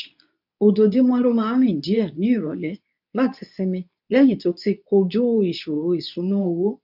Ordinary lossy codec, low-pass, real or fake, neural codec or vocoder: none; 5.4 kHz; fake; codec, 24 kHz, 0.9 kbps, WavTokenizer, medium speech release version 2